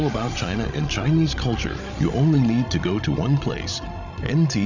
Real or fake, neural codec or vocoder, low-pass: fake; codec, 16 kHz, 16 kbps, FreqCodec, larger model; 7.2 kHz